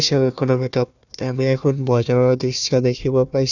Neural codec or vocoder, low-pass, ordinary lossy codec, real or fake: codec, 16 kHz, 1 kbps, FunCodec, trained on Chinese and English, 50 frames a second; 7.2 kHz; none; fake